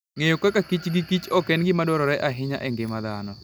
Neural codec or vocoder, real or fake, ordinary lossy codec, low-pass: none; real; none; none